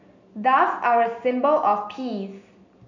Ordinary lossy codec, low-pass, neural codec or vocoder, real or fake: none; 7.2 kHz; none; real